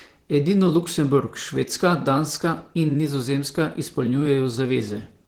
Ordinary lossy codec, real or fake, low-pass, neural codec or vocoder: Opus, 16 kbps; fake; 19.8 kHz; vocoder, 44.1 kHz, 128 mel bands, Pupu-Vocoder